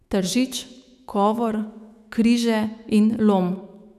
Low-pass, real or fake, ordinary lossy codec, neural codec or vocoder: 14.4 kHz; fake; none; autoencoder, 48 kHz, 128 numbers a frame, DAC-VAE, trained on Japanese speech